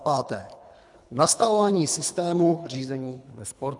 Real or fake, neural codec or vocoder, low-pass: fake; codec, 24 kHz, 3 kbps, HILCodec; 10.8 kHz